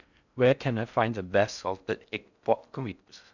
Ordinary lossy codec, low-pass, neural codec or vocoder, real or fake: none; 7.2 kHz; codec, 16 kHz in and 24 kHz out, 0.6 kbps, FocalCodec, streaming, 4096 codes; fake